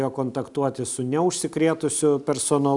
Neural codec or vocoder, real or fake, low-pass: none; real; 10.8 kHz